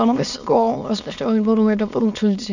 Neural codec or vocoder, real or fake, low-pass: autoencoder, 22.05 kHz, a latent of 192 numbers a frame, VITS, trained on many speakers; fake; 7.2 kHz